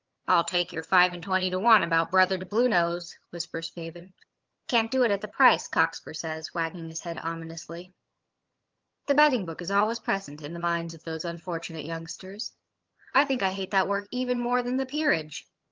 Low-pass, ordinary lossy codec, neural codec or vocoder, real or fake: 7.2 kHz; Opus, 32 kbps; vocoder, 22.05 kHz, 80 mel bands, HiFi-GAN; fake